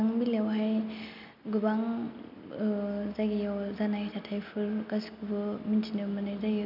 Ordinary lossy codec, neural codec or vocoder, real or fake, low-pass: none; none; real; 5.4 kHz